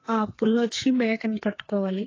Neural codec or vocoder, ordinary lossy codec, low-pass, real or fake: codec, 32 kHz, 1.9 kbps, SNAC; AAC, 32 kbps; 7.2 kHz; fake